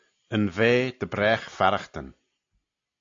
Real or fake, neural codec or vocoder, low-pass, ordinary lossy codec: real; none; 7.2 kHz; AAC, 48 kbps